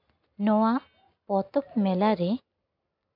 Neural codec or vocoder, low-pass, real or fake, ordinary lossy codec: none; 5.4 kHz; real; MP3, 48 kbps